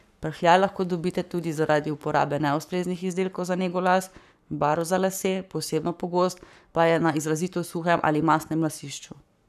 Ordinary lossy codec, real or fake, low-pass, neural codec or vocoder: none; fake; 14.4 kHz; codec, 44.1 kHz, 7.8 kbps, Pupu-Codec